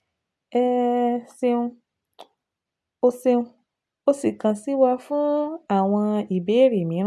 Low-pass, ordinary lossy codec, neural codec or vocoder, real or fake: none; none; none; real